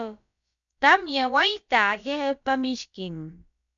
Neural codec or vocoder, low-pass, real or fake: codec, 16 kHz, about 1 kbps, DyCAST, with the encoder's durations; 7.2 kHz; fake